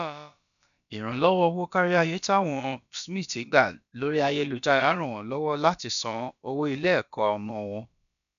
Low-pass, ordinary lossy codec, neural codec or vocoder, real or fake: 7.2 kHz; none; codec, 16 kHz, about 1 kbps, DyCAST, with the encoder's durations; fake